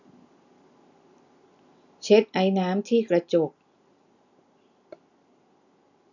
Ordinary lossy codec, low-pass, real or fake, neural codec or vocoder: none; 7.2 kHz; real; none